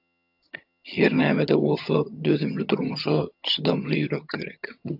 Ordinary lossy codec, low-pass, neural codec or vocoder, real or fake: AAC, 48 kbps; 5.4 kHz; vocoder, 22.05 kHz, 80 mel bands, HiFi-GAN; fake